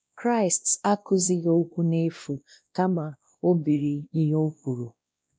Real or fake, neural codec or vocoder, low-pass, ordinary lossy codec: fake; codec, 16 kHz, 1 kbps, X-Codec, WavLM features, trained on Multilingual LibriSpeech; none; none